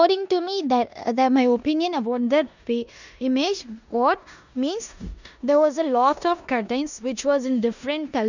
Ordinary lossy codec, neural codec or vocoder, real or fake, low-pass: none; codec, 16 kHz in and 24 kHz out, 0.9 kbps, LongCat-Audio-Codec, four codebook decoder; fake; 7.2 kHz